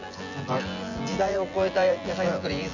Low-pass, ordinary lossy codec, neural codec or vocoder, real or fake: 7.2 kHz; none; vocoder, 24 kHz, 100 mel bands, Vocos; fake